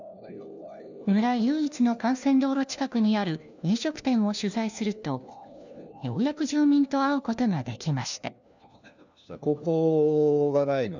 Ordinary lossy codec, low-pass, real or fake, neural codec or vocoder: none; 7.2 kHz; fake; codec, 16 kHz, 1 kbps, FunCodec, trained on LibriTTS, 50 frames a second